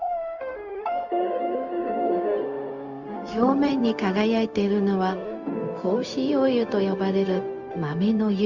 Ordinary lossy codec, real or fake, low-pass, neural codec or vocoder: Opus, 64 kbps; fake; 7.2 kHz; codec, 16 kHz, 0.4 kbps, LongCat-Audio-Codec